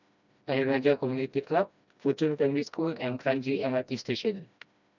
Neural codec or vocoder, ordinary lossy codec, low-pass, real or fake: codec, 16 kHz, 1 kbps, FreqCodec, smaller model; none; 7.2 kHz; fake